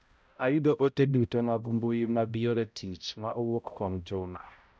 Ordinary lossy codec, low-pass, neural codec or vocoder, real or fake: none; none; codec, 16 kHz, 0.5 kbps, X-Codec, HuBERT features, trained on balanced general audio; fake